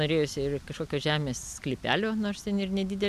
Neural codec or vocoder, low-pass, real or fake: none; 14.4 kHz; real